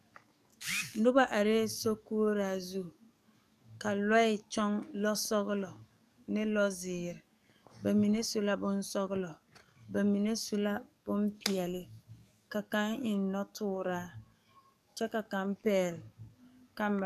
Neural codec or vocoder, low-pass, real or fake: codec, 44.1 kHz, 7.8 kbps, DAC; 14.4 kHz; fake